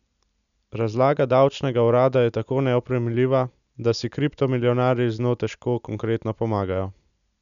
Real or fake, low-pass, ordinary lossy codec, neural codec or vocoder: real; 7.2 kHz; none; none